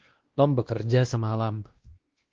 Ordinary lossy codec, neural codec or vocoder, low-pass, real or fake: Opus, 16 kbps; codec, 16 kHz, 1 kbps, X-Codec, WavLM features, trained on Multilingual LibriSpeech; 7.2 kHz; fake